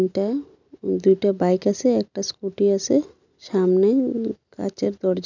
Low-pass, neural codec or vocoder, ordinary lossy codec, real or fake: 7.2 kHz; none; none; real